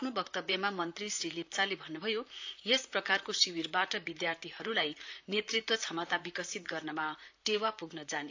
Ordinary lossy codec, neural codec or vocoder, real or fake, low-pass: AAC, 48 kbps; vocoder, 44.1 kHz, 128 mel bands, Pupu-Vocoder; fake; 7.2 kHz